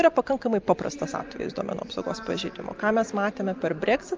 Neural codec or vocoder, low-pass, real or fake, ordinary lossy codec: none; 7.2 kHz; real; Opus, 24 kbps